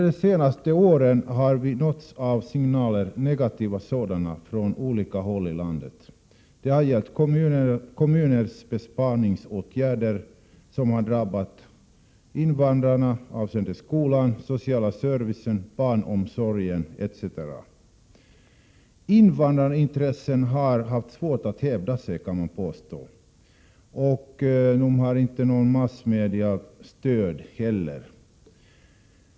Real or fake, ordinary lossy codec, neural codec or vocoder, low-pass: real; none; none; none